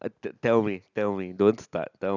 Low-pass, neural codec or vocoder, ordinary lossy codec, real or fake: 7.2 kHz; none; none; real